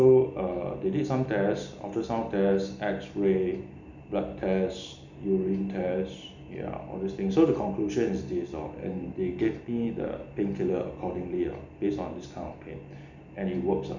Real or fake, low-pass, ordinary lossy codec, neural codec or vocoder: real; 7.2 kHz; none; none